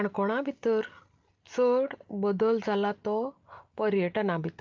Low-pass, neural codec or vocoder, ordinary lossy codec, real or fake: 7.2 kHz; none; Opus, 24 kbps; real